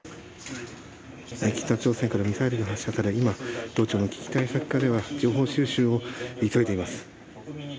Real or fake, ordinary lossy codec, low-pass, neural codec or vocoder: real; none; none; none